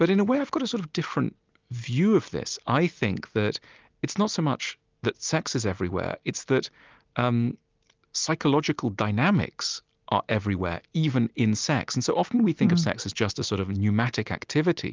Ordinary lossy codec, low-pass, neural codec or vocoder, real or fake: Opus, 24 kbps; 7.2 kHz; none; real